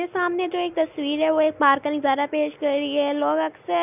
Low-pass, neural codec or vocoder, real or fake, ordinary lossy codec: 3.6 kHz; none; real; none